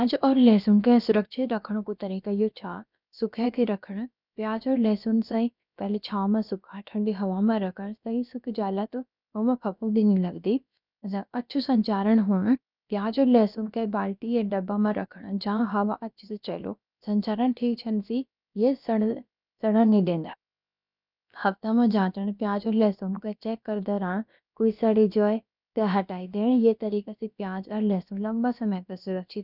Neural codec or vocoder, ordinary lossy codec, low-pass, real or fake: codec, 16 kHz, about 1 kbps, DyCAST, with the encoder's durations; none; 5.4 kHz; fake